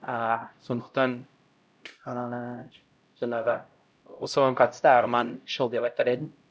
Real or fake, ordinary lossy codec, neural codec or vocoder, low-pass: fake; none; codec, 16 kHz, 0.5 kbps, X-Codec, HuBERT features, trained on LibriSpeech; none